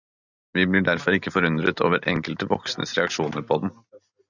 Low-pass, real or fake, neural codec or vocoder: 7.2 kHz; real; none